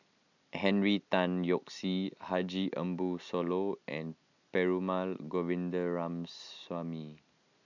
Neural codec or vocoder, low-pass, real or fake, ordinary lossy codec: none; 7.2 kHz; real; none